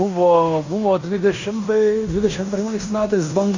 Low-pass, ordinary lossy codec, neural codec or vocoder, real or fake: 7.2 kHz; Opus, 64 kbps; codec, 16 kHz in and 24 kHz out, 0.9 kbps, LongCat-Audio-Codec, fine tuned four codebook decoder; fake